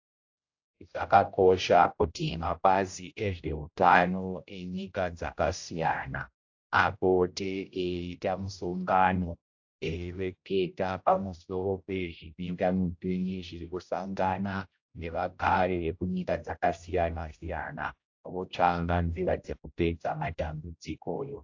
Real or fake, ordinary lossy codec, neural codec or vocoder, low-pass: fake; AAC, 48 kbps; codec, 16 kHz, 0.5 kbps, X-Codec, HuBERT features, trained on general audio; 7.2 kHz